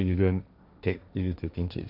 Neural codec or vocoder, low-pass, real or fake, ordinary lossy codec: codec, 16 kHz, 1.1 kbps, Voila-Tokenizer; 5.4 kHz; fake; none